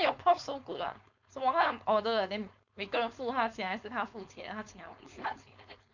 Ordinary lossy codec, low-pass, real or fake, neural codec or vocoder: none; 7.2 kHz; fake; codec, 16 kHz, 4.8 kbps, FACodec